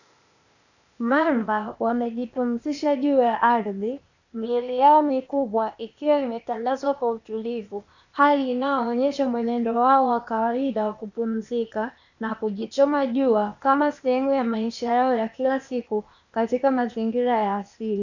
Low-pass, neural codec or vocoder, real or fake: 7.2 kHz; codec, 16 kHz, 0.8 kbps, ZipCodec; fake